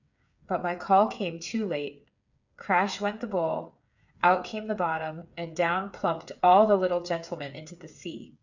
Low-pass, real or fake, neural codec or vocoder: 7.2 kHz; fake; codec, 16 kHz, 8 kbps, FreqCodec, smaller model